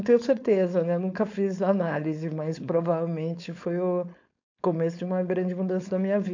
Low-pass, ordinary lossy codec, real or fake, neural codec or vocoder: 7.2 kHz; AAC, 48 kbps; fake; codec, 16 kHz, 4.8 kbps, FACodec